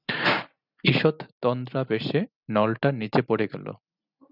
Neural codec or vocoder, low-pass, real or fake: none; 5.4 kHz; real